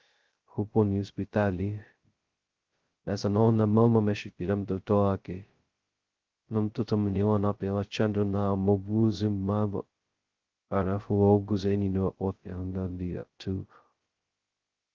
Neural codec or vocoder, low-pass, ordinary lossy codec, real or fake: codec, 16 kHz, 0.2 kbps, FocalCodec; 7.2 kHz; Opus, 16 kbps; fake